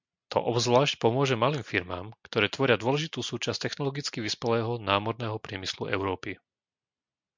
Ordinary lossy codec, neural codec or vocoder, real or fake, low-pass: MP3, 64 kbps; none; real; 7.2 kHz